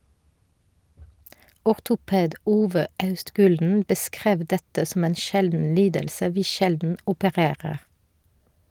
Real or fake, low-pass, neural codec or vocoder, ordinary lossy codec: real; 19.8 kHz; none; Opus, 24 kbps